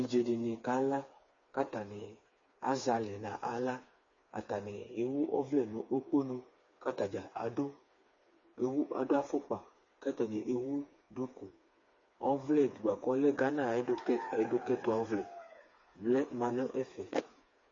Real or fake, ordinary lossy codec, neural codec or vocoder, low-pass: fake; MP3, 32 kbps; codec, 16 kHz, 4 kbps, FreqCodec, smaller model; 7.2 kHz